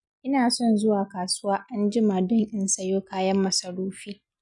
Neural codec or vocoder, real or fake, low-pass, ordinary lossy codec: none; real; 10.8 kHz; none